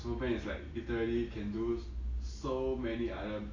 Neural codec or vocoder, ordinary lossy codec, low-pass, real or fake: none; AAC, 32 kbps; 7.2 kHz; real